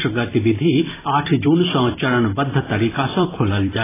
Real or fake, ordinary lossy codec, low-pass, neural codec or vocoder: fake; AAC, 16 kbps; 3.6 kHz; vocoder, 44.1 kHz, 128 mel bands every 256 samples, BigVGAN v2